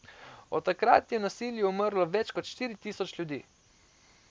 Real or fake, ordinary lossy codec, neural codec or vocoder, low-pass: real; none; none; none